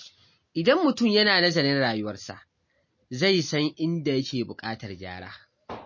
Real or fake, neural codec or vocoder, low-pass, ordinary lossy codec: real; none; 7.2 kHz; MP3, 32 kbps